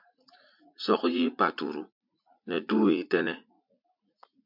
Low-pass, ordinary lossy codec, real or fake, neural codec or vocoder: 5.4 kHz; MP3, 48 kbps; fake; vocoder, 44.1 kHz, 80 mel bands, Vocos